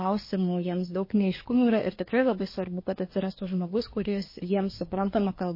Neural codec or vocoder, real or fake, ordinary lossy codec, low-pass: codec, 24 kHz, 1 kbps, SNAC; fake; MP3, 24 kbps; 5.4 kHz